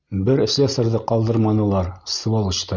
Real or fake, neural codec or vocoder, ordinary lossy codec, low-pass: real; none; Opus, 64 kbps; 7.2 kHz